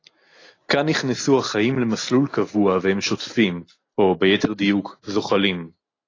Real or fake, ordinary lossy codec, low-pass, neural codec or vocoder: real; AAC, 32 kbps; 7.2 kHz; none